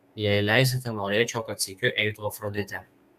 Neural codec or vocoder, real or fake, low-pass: codec, 32 kHz, 1.9 kbps, SNAC; fake; 14.4 kHz